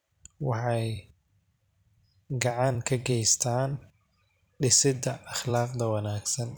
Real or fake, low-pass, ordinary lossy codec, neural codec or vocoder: fake; none; none; vocoder, 44.1 kHz, 128 mel bands every 256 samples, BigVGAN v2